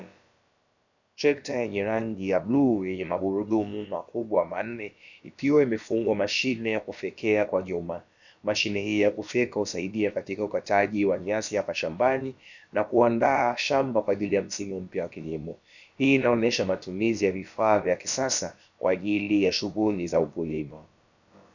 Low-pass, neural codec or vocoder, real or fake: 7.2 kHz; codec, 16 kHz, about 1 kbps, DyCAST, with the encoder's durations; fake